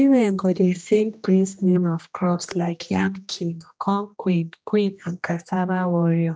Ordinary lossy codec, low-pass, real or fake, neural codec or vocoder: none; none; fake; codec, 16 kHz, 1 kbps, X-Codec, HuBERT features, trained on general audio